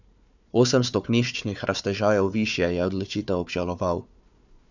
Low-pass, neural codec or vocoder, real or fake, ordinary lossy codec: 7.2 kHz; codec, 16 kHz, 4 kbps, FunCodec, trained on Chinese and English, 50 frames a second; fake; none